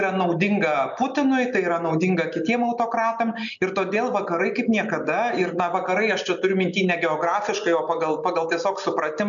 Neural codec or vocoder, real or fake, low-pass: none; real; 7.2 kHz